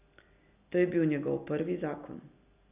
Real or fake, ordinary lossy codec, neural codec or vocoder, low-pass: real; none; none; 3.6 kHz